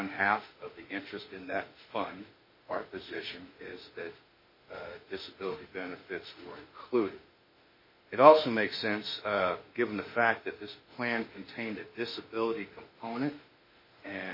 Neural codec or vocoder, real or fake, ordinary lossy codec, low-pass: autoencoder, 48 kHz, 32 numbers a frame, DAC-VAE, trained on Japanese speech; fake; MP3, 24 kbps; 5.4 kHz